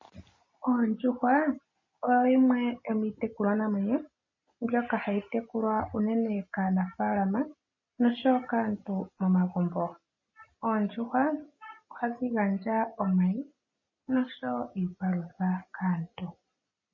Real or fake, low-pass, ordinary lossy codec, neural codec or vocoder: real; 7.2 kHz; MP3, 32 kbps; none